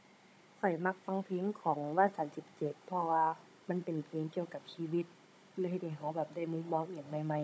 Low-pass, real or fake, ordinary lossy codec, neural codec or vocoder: none; fake; none; codec, 16 kHz, 16 kbps, FunCodec, trained on Chinese and English, 50 frames a second